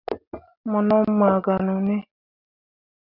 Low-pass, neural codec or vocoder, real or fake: 5.4 kHz; none; real